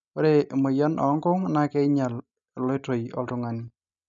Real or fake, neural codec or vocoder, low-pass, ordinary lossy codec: real; none; 7.2 kHz; MP3, 96 kbps